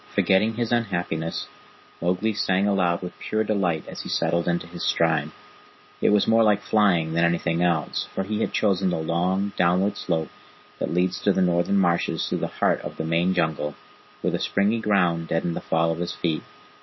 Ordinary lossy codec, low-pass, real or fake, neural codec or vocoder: MP3, 24 kbps; 7.2 kHz; real; none